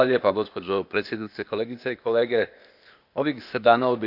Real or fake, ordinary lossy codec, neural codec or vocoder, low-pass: fake; Opus, 64 kbps; codec, 16 kHz, 0.8 kbps, ZipCodec; 5.4 kHz